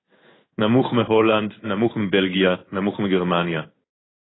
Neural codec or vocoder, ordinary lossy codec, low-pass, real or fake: codec, 16 kHz in and 24 kHz out, 1 kbps, XY-Tokenizer; AAC, 16 kbps; 7.2 kHz; fake